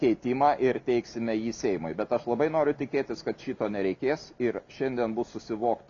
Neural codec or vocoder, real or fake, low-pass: none; real; 7.2 kHz